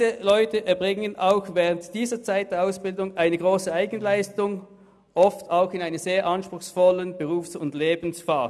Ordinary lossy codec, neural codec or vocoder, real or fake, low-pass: none; none; real; none